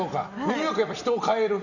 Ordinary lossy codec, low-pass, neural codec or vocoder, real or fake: none; 7.2 kHz; none; real